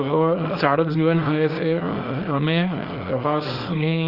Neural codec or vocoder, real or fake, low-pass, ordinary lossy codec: codec, 24 kHz, 0.9 kbps, WavTokenizer, small release; fake; 5.4 kHz; Opus, 24 kbps